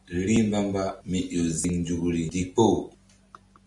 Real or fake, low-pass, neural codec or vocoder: real; 10.8 kHz; none